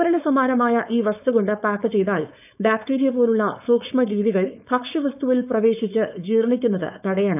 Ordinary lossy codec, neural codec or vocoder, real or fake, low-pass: none; codec, 16 kHz, 4.8 kbps, FACodec; fake; 3.6 kHz